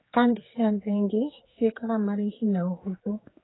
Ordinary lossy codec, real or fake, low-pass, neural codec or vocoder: AAC, 16 kbps; fake; 7.2 kHz; codec, 16 kHz, 4 kbps, X-Codec, HuBERT features, trained on general audio